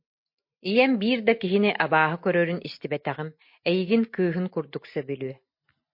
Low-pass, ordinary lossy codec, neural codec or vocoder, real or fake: 5.4 kHz; MP3, 32 kbps; none; real